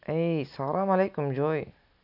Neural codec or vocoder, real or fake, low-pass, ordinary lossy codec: none; real; 5.4 kHz; none